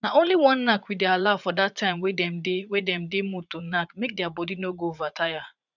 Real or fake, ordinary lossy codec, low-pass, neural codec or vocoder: fake; none; 7.2 kHz; vocoder, 44.1 kHz, 80 mel bands, Vocos